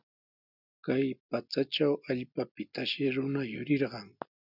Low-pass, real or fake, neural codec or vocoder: 5.4 kHz; real; none